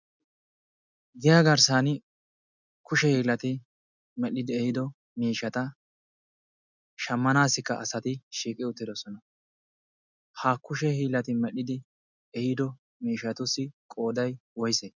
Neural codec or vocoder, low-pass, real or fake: none; 7.2 kHz; real